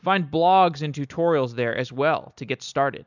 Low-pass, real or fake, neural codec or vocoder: 7.2 kHz; real; none